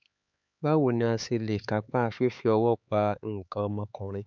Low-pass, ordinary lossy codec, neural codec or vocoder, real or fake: 7.2 kHz; none; codec, 16 kHz, 4 kbps, X-Codec, HuBERT features, trained on LibriSpeech; fake